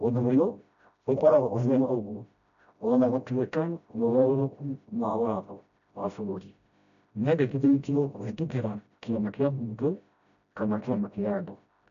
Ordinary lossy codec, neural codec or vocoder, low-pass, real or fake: none; codec, 16 kHz, 0.5 kbps, FreqCodec, smaller model; 7.2 kHz; fake